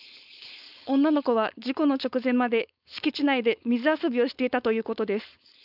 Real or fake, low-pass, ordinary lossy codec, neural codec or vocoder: fake; 5.4 kHz; none; codec, 16 kHz, 4.8 kbps, FACodec